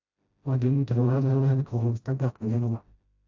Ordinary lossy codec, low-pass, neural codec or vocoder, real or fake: AAC, 48 kbps; 7.2 kHz; codec, 16 kHz, 0.5 kbps, FreqCodec, smaller model; fake